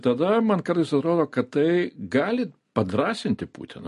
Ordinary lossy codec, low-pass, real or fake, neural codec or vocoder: MP3, 48 kbps; 14.4 kHz; real; none